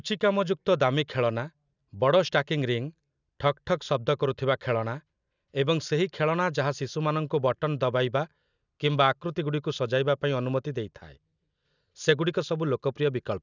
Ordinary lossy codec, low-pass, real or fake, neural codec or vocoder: none; 7.2 kHz; real; none